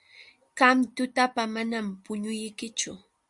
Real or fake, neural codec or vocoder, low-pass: real; none; 10.8 kHz